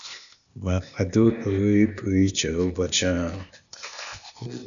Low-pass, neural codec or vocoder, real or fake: 7.2 kHz; codec, 16 kHz, 0.8 kbps, ZipCodec; fake